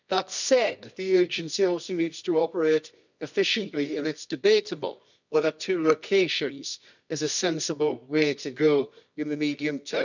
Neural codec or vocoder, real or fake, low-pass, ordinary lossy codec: codec, 24 kHz, 0.9 kbps, WavTokenizer, medium music audio release; fake; 7.2 kHz; none